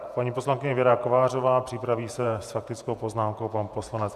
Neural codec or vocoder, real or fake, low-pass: vocoder, 48 kHz, 128 mel bands, Vocos; fake; 14.4 kHz